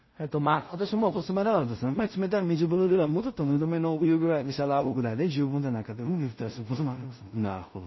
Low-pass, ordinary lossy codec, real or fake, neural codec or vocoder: 7.2 kHz; MP3, 24 kbps; fake; codec, 16 kHz in and 24 kHz out, 0.4 kbps, LongCat-Audio-Codec, two codebook decoder